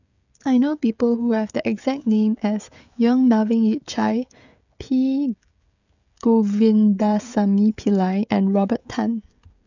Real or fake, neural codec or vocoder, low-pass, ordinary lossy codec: fake; codec, 16 kHz, 16 kbps, FreqCodec, smaller model; 7.2 kHz; none